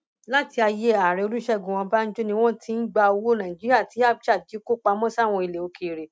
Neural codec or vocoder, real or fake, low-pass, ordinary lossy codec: none; real; none; none